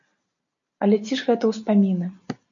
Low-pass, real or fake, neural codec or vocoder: 7.2 kHz; real; none